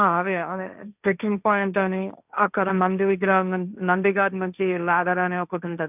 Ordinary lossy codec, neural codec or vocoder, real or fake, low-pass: none; codec, 16 kHz, 1.1 kbps, Voila-Tokenizer; fake; 3.6 kHz